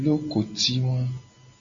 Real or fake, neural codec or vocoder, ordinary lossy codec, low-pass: real; none; MP3, 64 kbps; 7.2 kHz